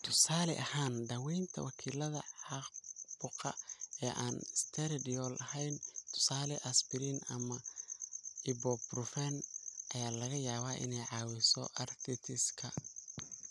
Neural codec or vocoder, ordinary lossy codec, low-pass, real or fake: none; none; none; real